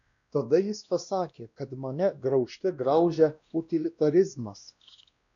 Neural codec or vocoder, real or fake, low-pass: codec, 16 kHz, 1 kbps, X-Codec, WavLM features, trained on Multilingual LibriSpeech; fake; 7.2 kHz